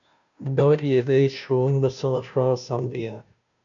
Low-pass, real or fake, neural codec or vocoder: 7.2 kHz; fake; codec, 16 kHz, 0.5 kbps, FunCodec, trained on Chinese and English, 25 frames a second